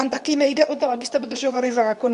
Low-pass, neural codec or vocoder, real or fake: 10.8 kHz; codec, 24 kHz, 0.9 kbps, WavTokenizer, medium speech release version 2; fake